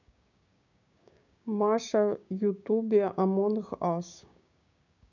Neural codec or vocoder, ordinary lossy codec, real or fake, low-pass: codec, 16 kHz, 6 kbps, DAC; none; fake; 7.2 kHz